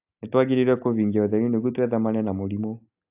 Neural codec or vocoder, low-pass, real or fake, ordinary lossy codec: none; 3.6 kHz; real; AAC, 32 kbps